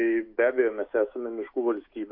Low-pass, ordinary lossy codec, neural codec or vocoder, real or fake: 5.4 kHz; AAC, 48 kbps; autoencoder, 48 kHz, 128 numbers a frame, DAC-VAE, trained on Japanese speech; fake